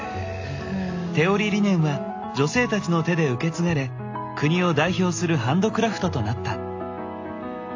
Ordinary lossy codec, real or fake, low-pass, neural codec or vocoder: AAC, 48 kbps; real; 7.2 kHz; none